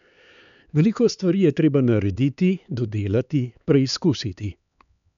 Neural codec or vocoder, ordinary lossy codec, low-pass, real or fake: codec, 16 kHz, 4 kbps, X-Codec, HuBERT features, trained on LibriSpeech; none; 7.2 kHz; fake